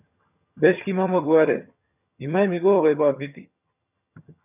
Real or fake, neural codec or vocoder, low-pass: fake; vocoder, 22.05 kHz, 80 mel bands, HiFi-GAN; 3.6 kHz